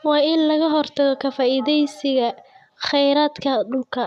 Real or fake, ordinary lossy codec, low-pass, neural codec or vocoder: real; none; 14.4 kHz; none